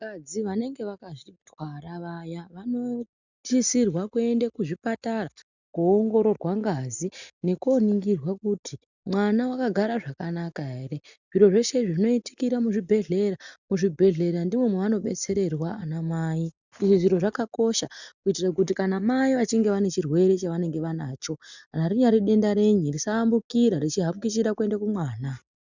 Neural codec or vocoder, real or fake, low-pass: none; real; 7.2 kHz